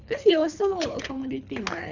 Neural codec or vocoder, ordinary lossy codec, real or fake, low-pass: codec, 24 kHz, 3 kbps, HILCodec; none; fake; 7.2 kHz